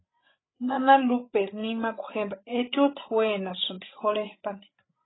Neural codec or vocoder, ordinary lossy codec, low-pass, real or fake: codec, 16 kHz, 16 kbps, FreqCodec, larger model; AAC, 16 kbps; 7.2 kHz; fake